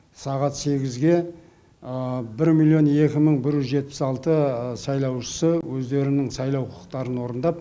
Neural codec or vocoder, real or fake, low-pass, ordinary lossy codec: none; real; none; none